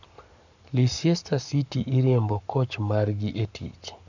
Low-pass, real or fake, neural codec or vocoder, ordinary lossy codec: 7.2 kHz; fake; codec, 44.1 kHz, 7.8 kbps, Pupu-Codec; none